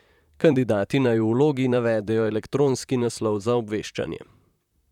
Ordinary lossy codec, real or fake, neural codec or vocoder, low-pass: none; fake; vocoder, 44.1 kHz, 128 mel bands, Pupu-Vocoder; 19.8 kHz